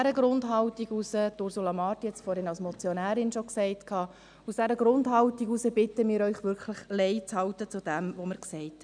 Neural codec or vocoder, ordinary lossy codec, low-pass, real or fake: none; MP3, 96 kbps; 9.9 kHz; real